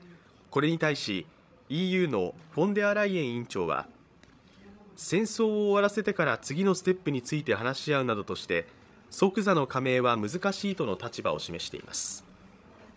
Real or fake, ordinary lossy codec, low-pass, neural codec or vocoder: fake; none; none; codec, 16 kHz, 16 kbps, FreqCodec, larger model